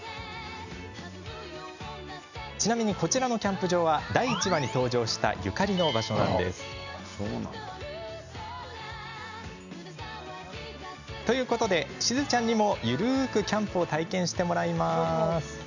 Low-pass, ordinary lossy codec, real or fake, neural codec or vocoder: 7.2 kHz; none; real; none